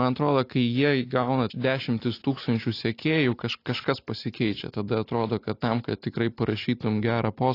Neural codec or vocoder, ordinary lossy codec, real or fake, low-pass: none; AAC, 32 kbps; real; 5.4 kHz